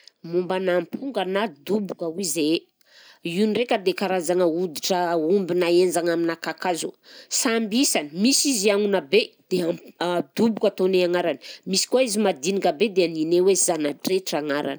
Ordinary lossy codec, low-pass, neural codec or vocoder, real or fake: none; none; none; real